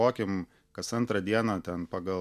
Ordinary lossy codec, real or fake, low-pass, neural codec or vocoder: MP3, 96 kbps; real; 14.4 kHz; none